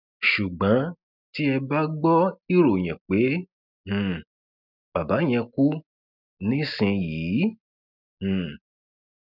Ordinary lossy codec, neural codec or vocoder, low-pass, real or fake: none; none; 5.4 kHz; real